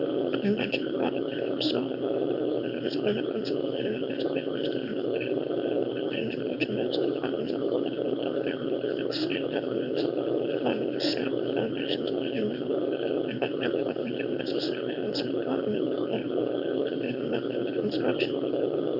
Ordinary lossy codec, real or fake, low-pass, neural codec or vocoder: Opus, 64 kbps; fake; 5.4 kHz; autoencoder, 22.05 kHz, a latent of 192 numbers a frame, VITS, trained on one speaker